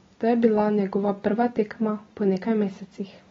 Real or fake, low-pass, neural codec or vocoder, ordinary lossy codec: real; 7.2 kHz; none; AAC, 24 kbps